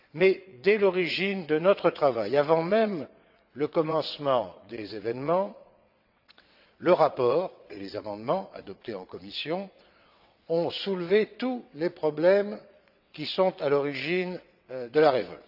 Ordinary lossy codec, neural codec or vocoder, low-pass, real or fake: AAC, 48 kbps; vocoder, 22.05 kHz, 80 mel bands, WaveNeXt; 5.4 kHz; fake